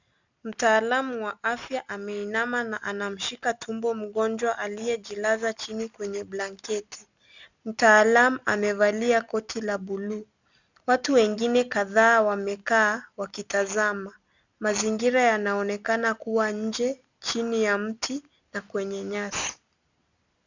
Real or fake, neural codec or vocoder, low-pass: real; none; 7.2 kHz